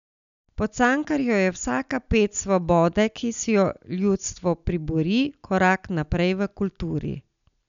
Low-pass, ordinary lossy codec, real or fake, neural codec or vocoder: 7.2 kHz; none; real; none